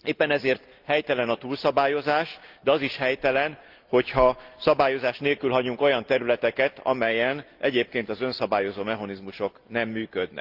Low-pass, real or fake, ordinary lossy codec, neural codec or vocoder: 5.4 kHz; real; Opus, 32 kbps; none